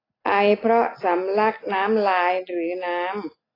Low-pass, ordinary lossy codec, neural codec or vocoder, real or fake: 5.4 kHz; AAC, 24 kbps; none; real